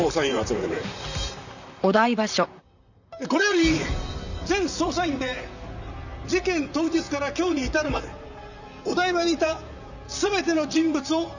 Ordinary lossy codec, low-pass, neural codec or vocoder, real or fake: none; 7.2 kHz; vocoder, 44.1 kHz, 128 mel bands, Pupu-Vocoder; fake